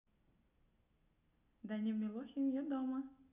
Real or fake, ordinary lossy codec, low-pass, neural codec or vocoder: real; none; 3.6 kHz; none